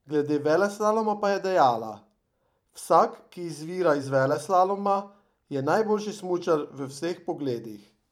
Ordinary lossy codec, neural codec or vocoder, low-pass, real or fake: none; vocoder, 44.1 kHz, 128 mel bands every 512 samples, BigVGAN v2; 19.8 kHz; fake